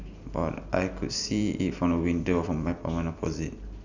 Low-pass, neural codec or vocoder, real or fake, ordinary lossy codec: 7.2 kHz; none; real; none